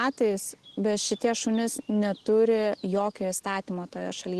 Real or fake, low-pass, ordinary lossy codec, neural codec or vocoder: real; 10.8 kHz; Opus, 16 kbps; none